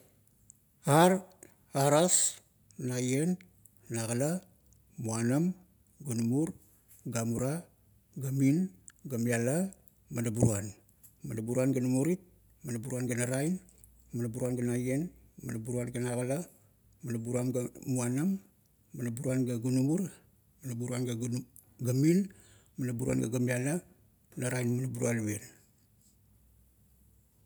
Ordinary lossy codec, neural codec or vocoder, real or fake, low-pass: none; none; real; none